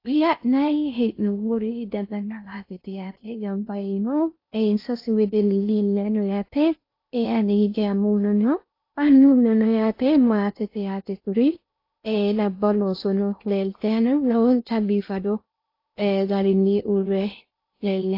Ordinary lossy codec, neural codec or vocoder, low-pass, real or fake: AAC, 32 kbps; codec, 16 kHz in and 24 kHz out, 0.6 kbps, FocalCodec, streaming, 4096 codes; 5.4 kHz; fake